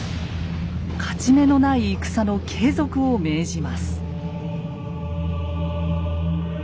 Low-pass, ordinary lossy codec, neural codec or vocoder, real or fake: none; none; none; real